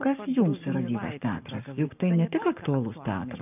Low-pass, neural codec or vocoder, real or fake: 3.6 kHz; codec, 44.1 kHz, 7.8 kbps, Pupu-Codec; fake